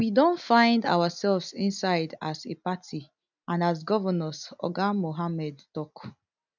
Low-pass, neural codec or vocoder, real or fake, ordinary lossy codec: 7.2 kHz; none; real; none